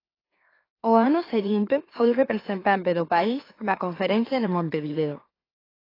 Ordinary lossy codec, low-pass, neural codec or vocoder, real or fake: AAC, 24 kbps; 5.4 kHz; autoencoder, 44.1 kHz, a latent of 192 numbers a frame, MeloTTS; fake